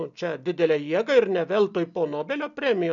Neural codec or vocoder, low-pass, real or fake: none; 7.2 kHz; real